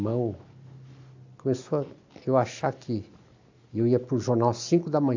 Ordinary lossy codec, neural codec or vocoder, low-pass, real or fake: AAC, 48 kbps; none; 7.2 kHz; real